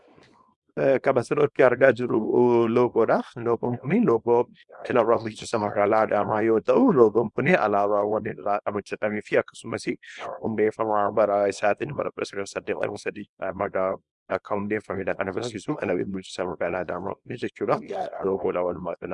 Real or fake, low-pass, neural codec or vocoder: fake; 10.8 kHz; codec, 24 kHz, 0.9 kbps, WavTokenizer, small release